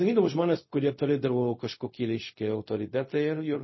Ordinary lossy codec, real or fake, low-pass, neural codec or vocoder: MP3, 24 kbps; fake; 7.2 kHz; codec, 16 kHz, 0.4 kbps, LongCat-Audio-Codec